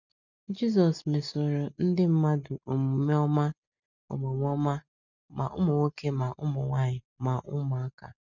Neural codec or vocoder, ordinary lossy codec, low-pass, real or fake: none; none; 7.2 kHz; real